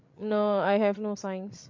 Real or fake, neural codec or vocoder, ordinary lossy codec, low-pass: fake; codec, 16 kHz, 16 kbps, FreqCodec, larger model; MP3, 48 kbps; 7.2 kHz